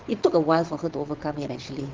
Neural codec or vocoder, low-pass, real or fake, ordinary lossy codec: vocoder, 22.05 kHz, 80 mel bands, WaveNeXt; 7.2 kHz; fake; Opus, 16 kbps